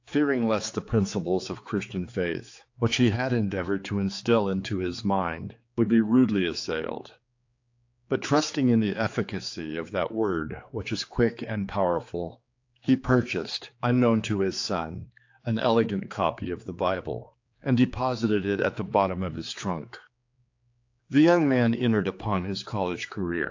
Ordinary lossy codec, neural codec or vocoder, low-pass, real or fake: AAC, 48 kbps; codec, 16 kHz, 4 kbps, X-Codec, HuBERT features, trained on general audio; 7.2 kHz; fake